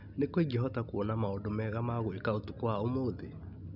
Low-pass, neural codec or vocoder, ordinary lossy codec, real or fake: 5.4 kHz; none; none; real